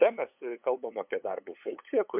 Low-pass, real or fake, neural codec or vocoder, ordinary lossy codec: 3.6 kHz; fake; codec, 16 kHz, 8 kbps, FunCodec, trained on LibriTTS, 25 frames a second; MP3, 32 kbps